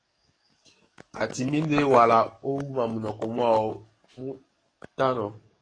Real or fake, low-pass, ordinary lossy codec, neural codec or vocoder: fake; 9.9 kHz; AAC, 32 kbps; codec, 44.1 kHz, 7.8 kbps, DAC